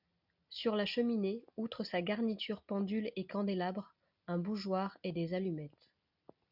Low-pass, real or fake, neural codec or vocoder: 5.4 kHz; real; none